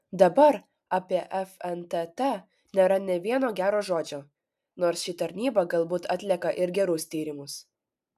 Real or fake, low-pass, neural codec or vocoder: real; 14.4 kHz; none